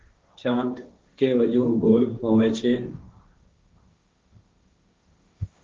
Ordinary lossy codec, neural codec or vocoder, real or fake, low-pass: Opus, 24 kbps; codec, 16 kHz, 1.1 kbps, Voila-Tokenizer; fake; 7.2 kHz